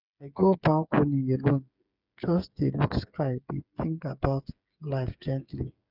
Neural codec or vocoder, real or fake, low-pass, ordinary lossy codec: codec, 16 kHz, 8 kbps, FreqCodec, smaller model; fake; 5.4 kHz; none